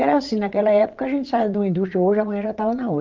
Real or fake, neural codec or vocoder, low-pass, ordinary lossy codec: fake; vocoder, 22.05 kHz, 80 mel bands, Vocos; 7.2 kHz; Opus, 24 kbps